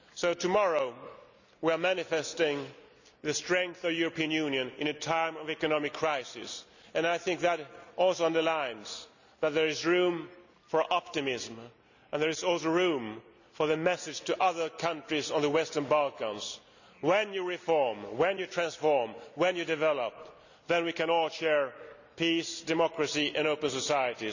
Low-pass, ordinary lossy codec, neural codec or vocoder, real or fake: 7.2 kHz; none; none; real